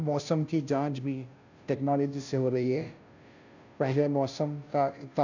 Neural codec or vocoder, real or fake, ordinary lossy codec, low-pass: codec, 16 kHz, 0.5 kbps, FunCodec, trained on Chinese and English, 25 frames a second; fake; none; 7.2 kHz